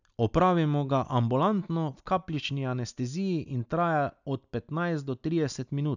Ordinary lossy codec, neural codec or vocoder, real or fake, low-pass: none; none; real; 7.2 kHz